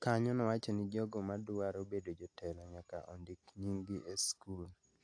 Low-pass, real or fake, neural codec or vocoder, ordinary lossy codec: 9.9 kHz; real; none; AAC, 48 kbps